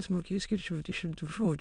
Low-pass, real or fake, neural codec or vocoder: 9.9 kHz; fake; autoencoder, 22.05 kHz, a latent of 192 numbers a frame, VITS, trained on many speakers